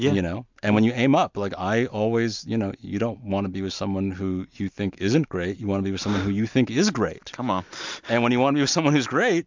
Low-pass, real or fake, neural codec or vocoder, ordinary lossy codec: 7.2 kHz; real; none; MP3, 64 kbps